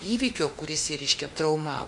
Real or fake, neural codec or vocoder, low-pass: fake; autoencoder, 48 kHz, 32 numbers a frame, DAC-VAE, trained on Japanese speech; 10.8 kHz